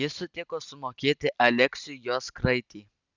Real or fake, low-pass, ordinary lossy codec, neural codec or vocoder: real; 7.2 kHz; Opus, 64 kbps; none